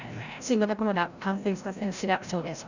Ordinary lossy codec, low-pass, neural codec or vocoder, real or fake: none; 7.2 kHz; codec, 16 kHz, 0.5 kbps, FreqCodec, larger model; fake